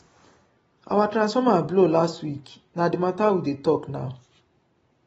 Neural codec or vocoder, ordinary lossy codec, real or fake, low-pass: none; AAC, 24 kbps; real; 14.4 kHz